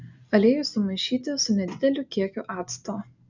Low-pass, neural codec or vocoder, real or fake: 7.2 kHz; none; real